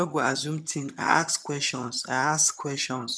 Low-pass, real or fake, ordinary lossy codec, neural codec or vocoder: none; fake; none; vocoder, 22.05 kHz, 80 mel bands, HiFi-GAN